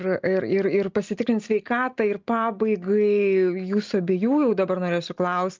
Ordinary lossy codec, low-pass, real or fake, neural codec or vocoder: Opus, 32 kbps; 7.2 kHz; real; none